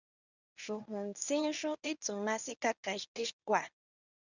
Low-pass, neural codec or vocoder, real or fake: 7.2 kHz; codec, 24 kHz, 0.9 kbps, WavTokenizer, medium speech release version 1; fake